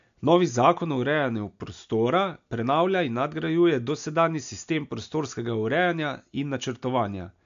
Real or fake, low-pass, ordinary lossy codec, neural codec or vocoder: real; 7.2 kHz; AAC, 64 kbps; none